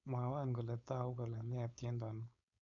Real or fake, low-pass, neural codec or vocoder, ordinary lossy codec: fake; 7.2 kHz; codec, 16 kHz, 4.8 kbps, FACodec; none